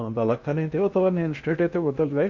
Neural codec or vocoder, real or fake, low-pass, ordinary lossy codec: codec, 16 kHz in and 24 kHz out, 0.6 kbps, FocalCodec, streaming, 2048 codes; fake; 7.2 kHz; none